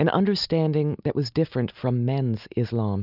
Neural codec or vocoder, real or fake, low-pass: none; real; 5.4 kHz